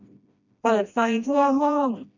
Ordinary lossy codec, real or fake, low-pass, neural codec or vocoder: none; fake; 7.2 kHz; codec, 16 kHz, 1 kbps, FreqCodec, smaller model